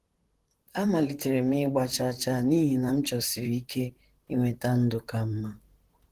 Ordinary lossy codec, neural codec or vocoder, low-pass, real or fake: Opus, 16 kbps; vocoder, 44.1 kHz, 128 mel bands, Pupu-Vocoder; 14.4 kHz; fake